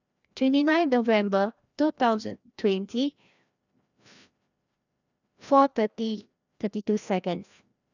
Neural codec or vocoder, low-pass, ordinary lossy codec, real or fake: codec, 16 kHz, 1 kbps, FreqCodec, larger model; 7.2 kHz; none; fake